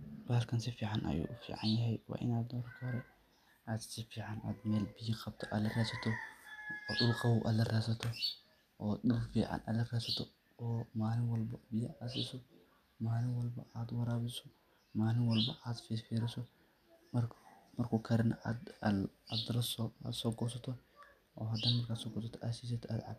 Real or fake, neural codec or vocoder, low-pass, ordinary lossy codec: real; none; 14.4 kHz; none